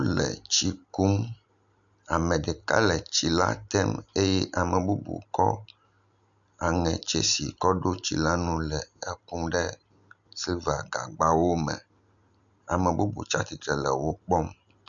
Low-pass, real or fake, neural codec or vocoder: 7.2 kHz; real; none